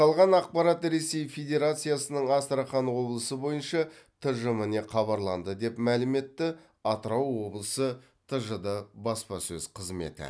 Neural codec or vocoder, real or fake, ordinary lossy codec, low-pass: none; real; none; none